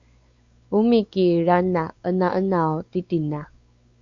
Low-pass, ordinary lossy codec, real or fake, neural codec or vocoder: 7.2 kHz; Opus, 64 kbps; fake; codec, 16 kHz, 4 kbps, X-Codec, WavLM features, trained on Multilingual LibriSpeech